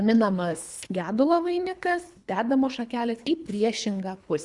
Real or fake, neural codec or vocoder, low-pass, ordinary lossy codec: fake; codec, 24 kHz, 3 kbps, HILCodec; 10.8 kHz; Opus, 64 kbps